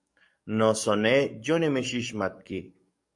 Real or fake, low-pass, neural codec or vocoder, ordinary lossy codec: fake; 10.8 kHz; codec, 44.1 kHz, 7.8 kbps, DAC; MP3, 64 kbps